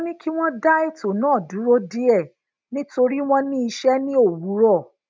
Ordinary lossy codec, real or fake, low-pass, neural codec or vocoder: none; real; none; none